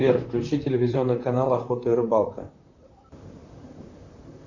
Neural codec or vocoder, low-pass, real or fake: vocoder, 44.1 kHz, 128 mel bands, Pupu-Vocoder; 7.2 kHz; fake